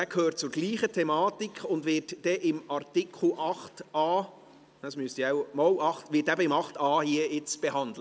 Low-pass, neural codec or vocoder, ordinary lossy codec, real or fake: none; none; none; real